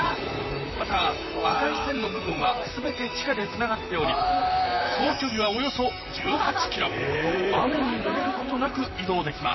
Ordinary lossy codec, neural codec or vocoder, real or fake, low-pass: MP3, 24 kbps; vocoder, 44.1 kHz, 128 mel bands, Pupu-Vocoder; fake; 7.2 kHz